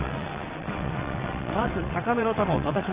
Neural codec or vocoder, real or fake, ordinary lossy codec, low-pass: vocoder, 22.05 kHz, 80 mel bands, WaveNeXt; fake; Opus, 64 kbps; 3.6 kHz